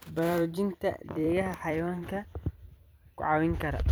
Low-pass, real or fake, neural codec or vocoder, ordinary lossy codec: none; fake; vocoder, 44.1 kHz, 128 mel bands every 256 samples, BigVGAN v2; none